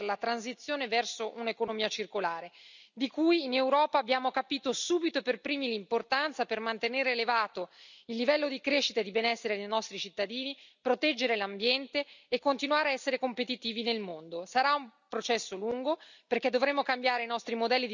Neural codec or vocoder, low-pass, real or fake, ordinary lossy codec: none; 7.2 kHz; real; none